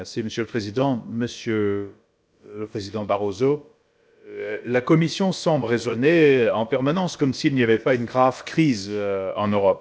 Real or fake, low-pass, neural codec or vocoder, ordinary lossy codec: fake; none; codec, 16 kHz, about 1 kbps, DyCAST, with the encoder's durations; none